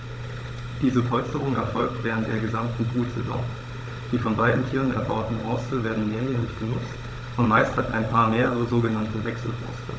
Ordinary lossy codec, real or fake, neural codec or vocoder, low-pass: none; fake; codec, 16 kHz, 16 kbps, FunCodec, trained on Chinese and English, 50 frames a second; none